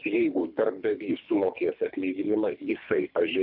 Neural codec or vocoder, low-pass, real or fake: codec, 24 kHz, 3 kbps, HILCodec; 5.4 kHz; fake